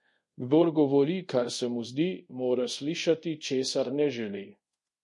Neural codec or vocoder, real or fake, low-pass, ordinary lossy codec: codec, 24 kHz, 0.5 kbps, DualCodec; fake; 10.8 kHz; MP3, 48 kbps